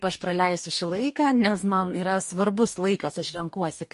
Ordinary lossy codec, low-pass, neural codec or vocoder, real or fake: MP3, 48 kbps; 14.4 kHz; codec, 44.1 kHz, 2.6 kbps, DAC; fake